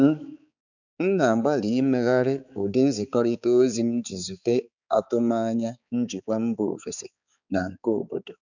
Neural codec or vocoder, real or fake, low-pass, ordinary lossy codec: codec, 16 kHz, 4 kbps, X-Codec, HuBERT features, trained on balanced general audio; fake; 7.2 kHz; none